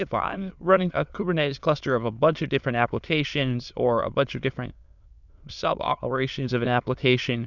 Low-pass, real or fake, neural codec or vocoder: 7.2 kHz; fake; autoencoder, 22.05 kHz, a latent of 192 numbers a frame, VITS, trained on many speakers